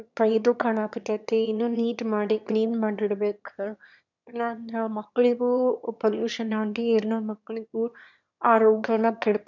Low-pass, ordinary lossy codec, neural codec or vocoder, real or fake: 7.2 kHz; none; autoencoder, 22.05 kHz, a latent of 192 numbers a frame, VITS, trained on one speaker; fake